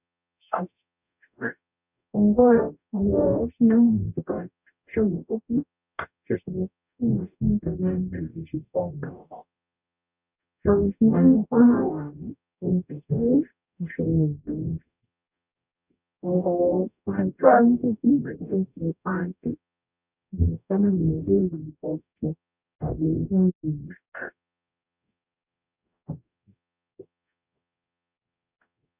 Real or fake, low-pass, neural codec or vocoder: fake; 3.6 kHz; codec, 44.1 kHz, 0.9 kbps, DAC